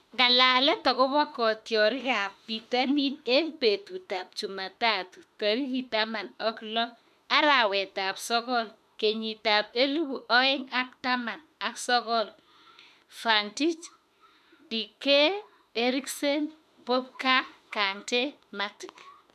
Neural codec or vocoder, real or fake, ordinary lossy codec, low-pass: autoencoder, 48 kHz, 32 numbers a frame, DAC-VAE, trained on Japanese speech; fake; MP3, 96 kbps; 14.4 kHz